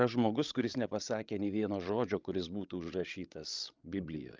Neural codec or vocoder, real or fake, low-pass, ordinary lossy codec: codec, 16 kHz, 16 kbps, FreqCodec, larger model; fake; 7.2 kHz; Opus, 24 kbps